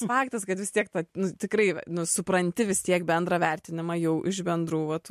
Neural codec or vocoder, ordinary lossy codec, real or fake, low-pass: none; MP3, 64 kbps; real; 14.4 kHz